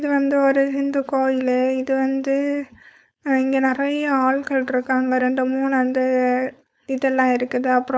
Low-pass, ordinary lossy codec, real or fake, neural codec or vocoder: none; none; fake; codec, 16 kHz, 4.8 kbps, FACodec